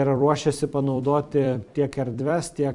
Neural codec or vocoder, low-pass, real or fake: vocoder, 44.1 kHz, 128 mel bands every 256 samples, BigVGAN v2; 10.8 kHz; fake